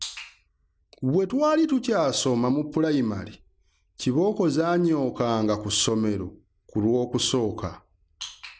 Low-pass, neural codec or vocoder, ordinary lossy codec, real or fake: none; none; none; real